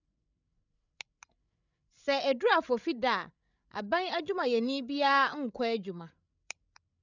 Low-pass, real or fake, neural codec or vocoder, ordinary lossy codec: 7.2 kHz; fake; codec, 16 kHz, 16 kbps, FreqCodec, larger model; none